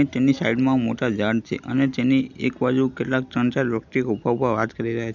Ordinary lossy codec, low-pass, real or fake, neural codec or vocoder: none; 7.2 kHz; real; none